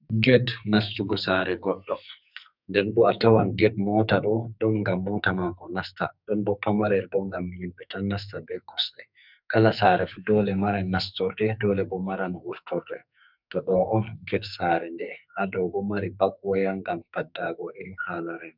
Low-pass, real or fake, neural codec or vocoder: 5.4 kHz; fake; codec, 44.1 kHz, 2.6 kbps, SNAC